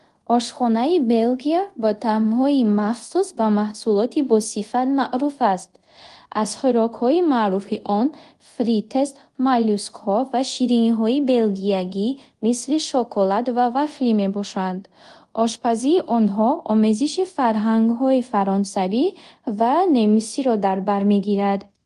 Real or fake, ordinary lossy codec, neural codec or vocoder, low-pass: fake; Opus, 24 kbps; codec, 24 kHz, 0.5 kbps, DualCodec; 10.8 kHz